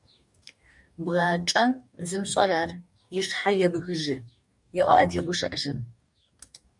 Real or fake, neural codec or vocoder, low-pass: fake; codec, 44.1 kHz, 2.6 kbps, DAC; 10.8 kHz